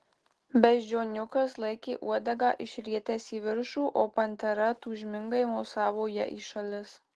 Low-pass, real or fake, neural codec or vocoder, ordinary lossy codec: 10.8 kHz; real; none; Opus, 16 kbps